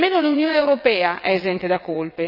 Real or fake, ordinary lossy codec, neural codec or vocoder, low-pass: fake; none; vocoder, 22.05 kHz, 80 mel bands, WaveNeXt; 5.4 kHz